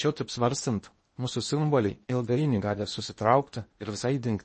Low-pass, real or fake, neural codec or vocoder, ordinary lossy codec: 10.8 kHz; fake; codec, 16 kHz in and 24 kHz out, 0.8 kbps, FocalCodec, streaming, 65536 codes; MP3, 32 kbps